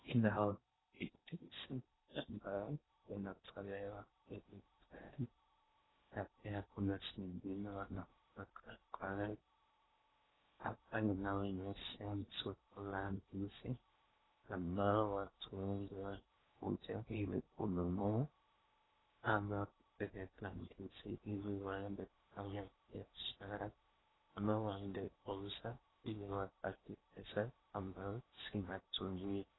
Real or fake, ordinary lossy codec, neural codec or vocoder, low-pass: fake; AAC, 16 kbps; codec, 16 kHz in and 24 kHz out, 0.8 kbps, FocalCodec, streaming, 65536 codes; 7.2 kHz